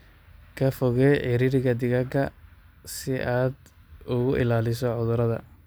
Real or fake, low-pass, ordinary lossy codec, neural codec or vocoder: real; none; none; none